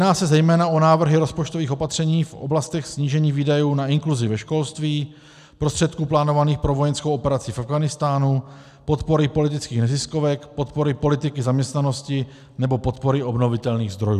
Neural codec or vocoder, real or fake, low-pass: none; real; 14.4 kHz